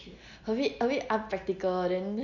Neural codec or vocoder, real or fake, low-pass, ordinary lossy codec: none; real; 7.2 kHz; none